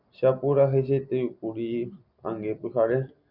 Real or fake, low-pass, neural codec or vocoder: real; 5.4 kHz; none